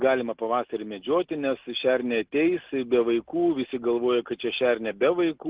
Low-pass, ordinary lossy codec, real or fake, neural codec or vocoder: 3.6 kHz; Opus, 16 kbps; real; none